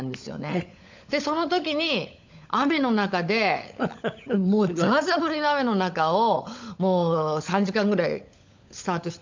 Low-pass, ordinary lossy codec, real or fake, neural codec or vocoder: 7.2 kHz; MP3, 64 kbps; fake; codec, 16 kHz, 16 kbps, FunCodec, trained on LibriTTS, 50 frames a second